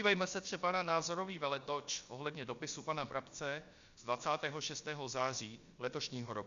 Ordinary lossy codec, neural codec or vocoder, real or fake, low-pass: Opus, 64 kbps; codec, 16 kHz, about 1 kbps, DyCAST, with the encoder's durations; fake; 7.2 kHz